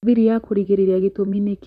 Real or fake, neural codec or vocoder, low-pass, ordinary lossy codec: fake; vocoder, 44.1 kHz, 128 mel bands, Pupu-Vocoder; 14.4 kHz; none